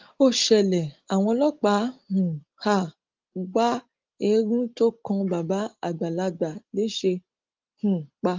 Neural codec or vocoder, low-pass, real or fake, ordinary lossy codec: none; 7.2 kHz; real; Opus, 32 kbps